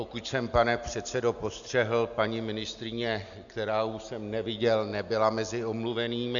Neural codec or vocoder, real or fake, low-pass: none; real; 7.2 kHz